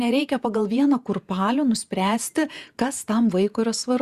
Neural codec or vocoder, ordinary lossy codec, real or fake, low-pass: vocoder, 48 kHz, 128 mel bands, Vocos; Opus, 64 kbps; fake; 14.4 kHz